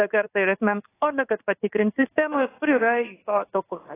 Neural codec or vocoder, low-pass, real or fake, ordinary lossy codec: codec, 16 kHz in and 24 kHz out, 1 kbps, XY-Tokenizer; 3.6 kHz; fake; AAC, 16 kbps